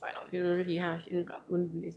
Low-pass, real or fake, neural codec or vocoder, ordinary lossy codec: none; fake; autoencoder, 22.05 kHz, a latent of 192 numbers a frame, VITS, trained on one speaker; none